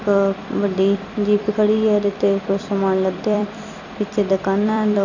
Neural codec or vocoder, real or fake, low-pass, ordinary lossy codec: vocoder, 44.1 kHz, 128 mel bands every 256 samples, BigVGAN v2; fake; 7.2 kHz; none